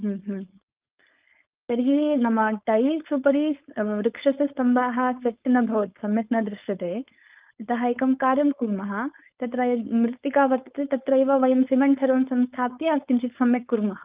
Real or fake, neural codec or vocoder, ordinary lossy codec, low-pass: fake; codec, 16 kHz, 4.8 kbps, FACodec; Opus, 24 kbps; 3.6 kHz